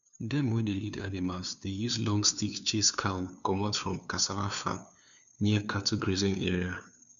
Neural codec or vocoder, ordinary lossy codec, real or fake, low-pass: codec, 16 kHz, 2 kbps, FunCodec, trained on LibriTTS, 25 frames a second; none; fake; 7.2 kHz